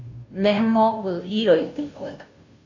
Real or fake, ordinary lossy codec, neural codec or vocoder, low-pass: fake; AAC, 48 kbps; codec, 16 kHz, 0.5 kbps, FunCodec, trained on Chinese and English, 25 frames a second; 7.2 kHz